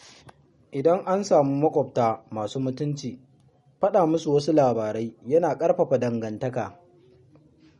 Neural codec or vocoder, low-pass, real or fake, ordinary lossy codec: none; 19.8 kHz; real; MP3, 48 kbps